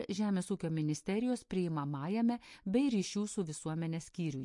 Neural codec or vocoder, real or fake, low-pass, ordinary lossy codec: none; real; 10.8 kHz; MP3, 48 kbps